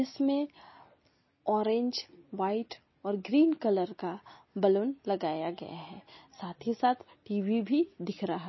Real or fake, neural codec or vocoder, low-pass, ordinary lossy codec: real; none; 7.2 kHz; MP3, 24 kbps